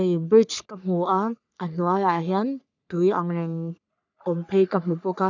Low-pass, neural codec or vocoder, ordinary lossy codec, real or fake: 7.2 kHz; codec, 44.1 kHz, 3.4 kbps, Pupu-Codec; none; fake